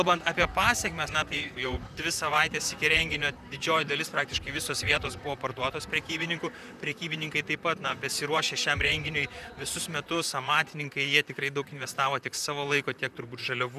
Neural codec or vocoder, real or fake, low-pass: vocoder, 44.1 kHz, 128 mel bands, Pupu-Vocoder; fake; 14.4 kHz